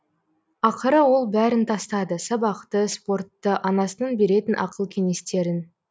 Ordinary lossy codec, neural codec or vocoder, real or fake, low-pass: none; none; real; none